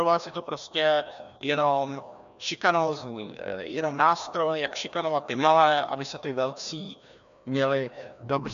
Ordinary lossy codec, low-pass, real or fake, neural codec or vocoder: AAC, 96 kbps; 7.2 kHz; fake; codec, 16 kHz, 1 kbps, FreqCodec, larger model